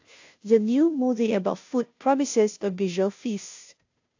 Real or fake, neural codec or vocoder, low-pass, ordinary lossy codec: fake; codec, 16 kHz, 0.5 kbps, FunCodec, trained on Chinese and English, 25 frames a second; 7.2 kHz; AAC, 48 kbps